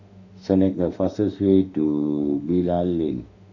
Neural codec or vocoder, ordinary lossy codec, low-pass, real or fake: autoencoder, 48 kHz, 32 numbers a frame, DAC-VAE, trained on Japanese speech; none; 7.2 kHz; fake